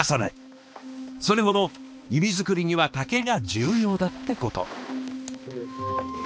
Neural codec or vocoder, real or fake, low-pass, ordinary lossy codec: codec, 16 kHz, 2 kbps, X-Codec, HuBERT features, trained on balanced general audio; fake; none; none